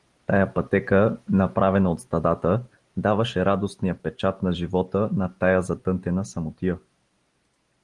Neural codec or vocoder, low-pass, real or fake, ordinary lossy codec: none; 10.8 kHz; real; Opus, 24 kbps